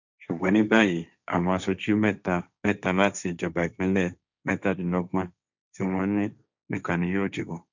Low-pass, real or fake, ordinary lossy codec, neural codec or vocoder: 7.2 kHz; fake; none; codec, 16 kHz, 1.1 kbps, Voila-Tokenizer